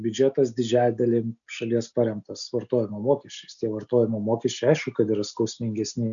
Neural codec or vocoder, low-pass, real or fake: none; 7.2 kHz; real